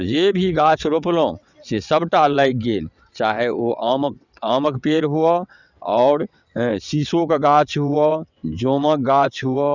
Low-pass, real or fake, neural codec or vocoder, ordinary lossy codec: 7.2 kHz; fake; vocoder, 22.05 kHz, 80 mel bands, WaveNeXt; none